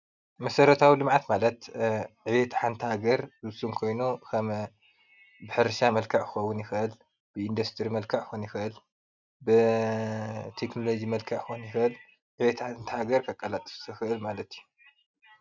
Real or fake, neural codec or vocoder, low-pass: real; none; 7.2 kHz